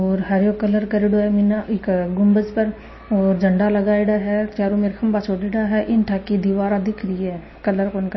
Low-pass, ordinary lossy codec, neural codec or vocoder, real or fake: 7.2 kHz; MP3, 24 kbps; none; real